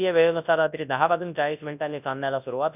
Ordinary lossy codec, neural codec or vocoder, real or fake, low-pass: none; codec, 24 kHz, 0.9 kbps, WavTokenizer, large speech release; fake; 3.6 kHz